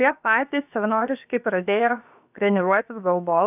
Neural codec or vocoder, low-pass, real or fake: codec, 16 kHz, about 1 kbps, DyCAST, with the encoder's durations; 3.6 kHz; fake